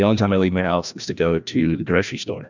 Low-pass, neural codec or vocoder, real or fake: 7.2 kHz; codec, 16 kHz, 1 kbps, FreqCodec, larger model; fake